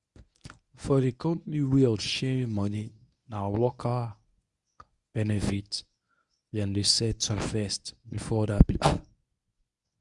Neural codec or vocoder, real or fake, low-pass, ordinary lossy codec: codec, 24 kHz, 0.9 kbps, WavTokenizer, medium speech release version 1; fake; 10.8 kHz; Opus, 64 kbps